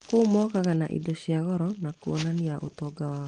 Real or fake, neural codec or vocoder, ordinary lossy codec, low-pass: real; none; Opus, 24 kbps; 9.9 kHz